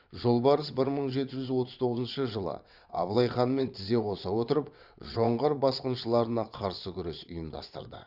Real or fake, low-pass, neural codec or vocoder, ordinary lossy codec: fake; 5.4 kHz; vocoder, 22.05 kHz, 80 mel bands, WaveNeXt; Opus, 64 kbps